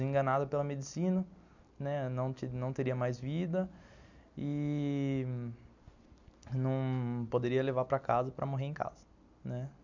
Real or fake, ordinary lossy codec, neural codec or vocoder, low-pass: real; none; none; 7.2 kHz